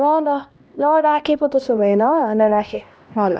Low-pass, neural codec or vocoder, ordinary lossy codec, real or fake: none; codec, 16 kHz, 1 kbps, X-Codec, HuBERT features, trained on LibriSpeech; none; fake